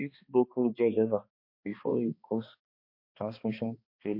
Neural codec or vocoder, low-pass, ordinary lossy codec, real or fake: codec, 16 kHz, 2 kbps, X-Codec, HuBERT features, trained on balanced general audio; 5.4 kHz; MP3, 32 kbps; fake